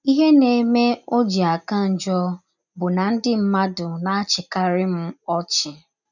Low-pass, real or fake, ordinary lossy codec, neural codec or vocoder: 7.2 kHz; real; none; none